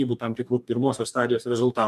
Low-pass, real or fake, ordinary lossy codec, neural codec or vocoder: 14.4 kHz; fake; MP3, 96 kbps; codec, 44.1 kHz, 2.6 kbps, DAC